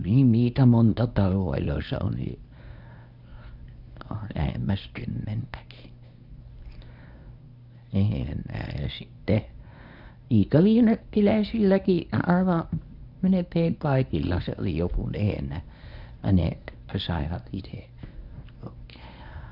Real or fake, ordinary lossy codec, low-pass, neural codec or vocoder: fake; none; 5.4 kHz; codec, 24 kHz, 0.9 kbps, WavTokenizer, medium speech release version 1